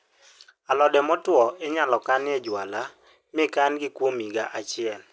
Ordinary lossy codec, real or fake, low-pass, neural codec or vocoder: none; real; none; none